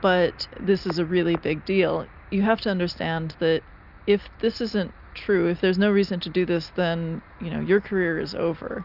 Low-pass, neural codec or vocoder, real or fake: 5.4 kHz; none; real